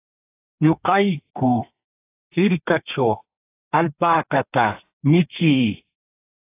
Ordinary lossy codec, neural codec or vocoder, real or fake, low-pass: AAC, 24 kbps; codec, 32 kHz, 1.9 kbps, SNAC; fake; 3.6 kHz